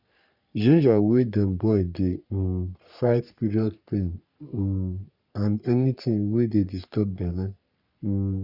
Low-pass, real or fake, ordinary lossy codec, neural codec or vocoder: 5.4 kHz; fake; Opus, 64 kbps; codec, 44.1 kHz, 3.4 kbps, Pupu-Codec